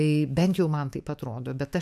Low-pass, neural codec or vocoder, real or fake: 14.4 kHz; autoencoder, 48 kHz, 128 numbers a frame, DAC-VAE, trained on Japanese speech; fake